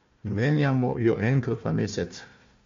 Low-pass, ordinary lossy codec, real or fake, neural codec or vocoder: 7.2 kHz; MP3, 48 kbps; fake; codec, 16 kHz, 1 kbps, FunCodec, trained on Chinese and English, 50 frames a second